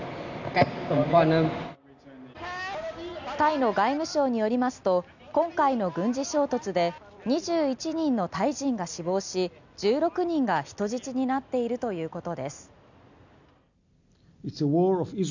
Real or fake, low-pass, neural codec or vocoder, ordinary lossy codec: real; 7.2 kHz; none; none